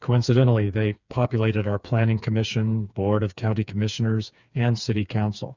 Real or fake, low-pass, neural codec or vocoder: fake; 7.2 kHz; codec, 16 kHz, 4 kbps, FreqCodec, smaller model